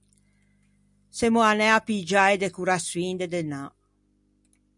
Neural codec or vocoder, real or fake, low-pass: none; real; 10.8 kHz